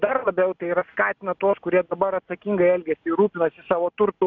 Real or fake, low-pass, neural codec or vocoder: real; 7.2 kHz; none